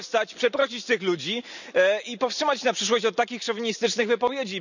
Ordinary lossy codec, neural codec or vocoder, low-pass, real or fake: none; none; 7.2 kHz; real